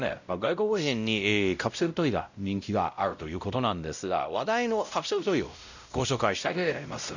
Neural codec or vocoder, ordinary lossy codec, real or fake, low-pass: codec, 16 kHz, 0.5 kbps, X-Codec, WavLM features, trained on Multilingual LibriSpeech; none; fake; 7.2 kHz